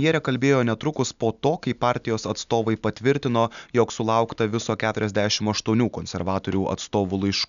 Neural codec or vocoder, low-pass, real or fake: none; 7.2 kHz; real